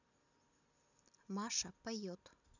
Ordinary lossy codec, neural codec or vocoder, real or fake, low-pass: none; none; real; 7.2 kHz